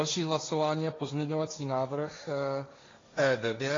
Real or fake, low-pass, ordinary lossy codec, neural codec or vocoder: fake; 7.2 kHz; AAC, 32 kbps; codec, 16 kHz, 1.1 kbps, Voila-Tokenizer